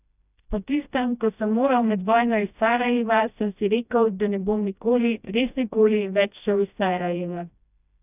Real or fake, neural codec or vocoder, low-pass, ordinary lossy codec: fake; codec, 16 kHz, 1 kbps, FreqCodec, smaller model; 3.6 kHz; none